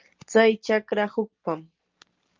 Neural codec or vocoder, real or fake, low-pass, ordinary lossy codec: none; real; 7.2 kHz; Opus, 32 kbps